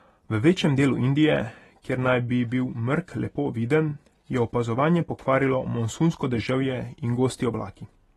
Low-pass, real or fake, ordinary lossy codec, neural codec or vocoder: 19.8 kHz; real; AAC, 32 kbps; none